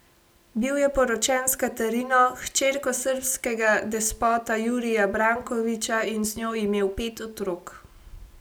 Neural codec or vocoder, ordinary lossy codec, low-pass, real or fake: none; none; none; real